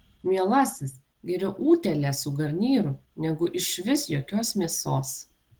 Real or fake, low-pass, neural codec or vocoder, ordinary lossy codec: real; 19.8 kHz; none; Opus, 16 kbps